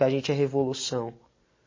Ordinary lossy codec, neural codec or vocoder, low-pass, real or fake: MP3, 32 kbps; none; 7.2 kHz; real